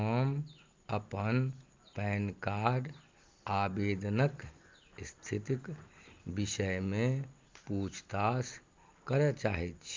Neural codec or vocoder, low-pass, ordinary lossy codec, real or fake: none; 7.2 kHz; Opus, 32 kbps; real